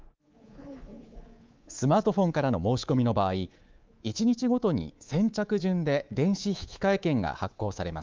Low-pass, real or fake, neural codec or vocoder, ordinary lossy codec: 7.2 kHz; fake; codec, 24 kHz, 3.1 kbps, DualCodec; Opus, 24 kbps